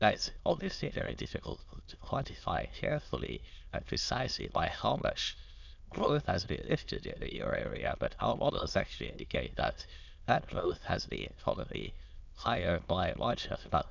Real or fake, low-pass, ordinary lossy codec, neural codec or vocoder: fake; 7.2 kHz; none; autoencoder, 22.05 kHz, a latent of 192 numbers a frame, VITS, trained on many speakers